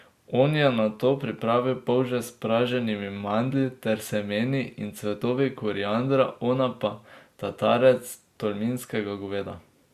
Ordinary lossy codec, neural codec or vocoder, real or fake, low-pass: Opus, 64 kbps; none; real; 14.4 kHz